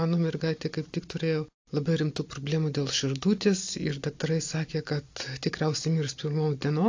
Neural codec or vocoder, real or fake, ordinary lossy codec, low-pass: none; real; AAC, 48 kbps; 7.2 kHz